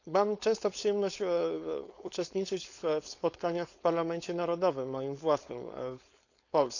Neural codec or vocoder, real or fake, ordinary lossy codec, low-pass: codec, 16 kHz, 4.8 kbps, FACodec; fake; none; 7.2 kHz